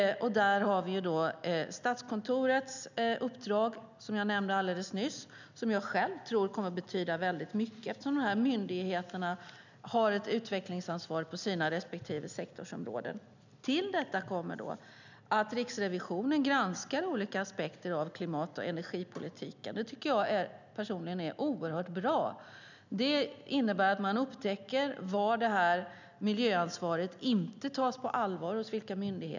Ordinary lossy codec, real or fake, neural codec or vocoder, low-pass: none; real; none; 7.2 kHz